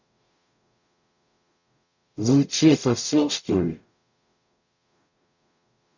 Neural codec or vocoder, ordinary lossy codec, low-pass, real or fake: codec, 44.1 kHz, 0.9 kbps, DAC; none; 7.2 kHz; fake